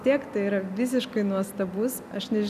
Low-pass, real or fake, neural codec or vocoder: 14.4 kHz; real; none